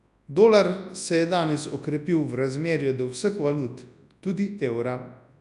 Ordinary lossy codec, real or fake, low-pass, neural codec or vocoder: none; fake; 10.8 kHz; codec, 24 kHz, 0.9 kbps, WavTokenizer, large speech release